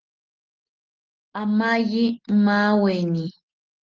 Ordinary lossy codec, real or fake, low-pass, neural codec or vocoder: Opus, 16 kbps; real; 7.2 kHz; none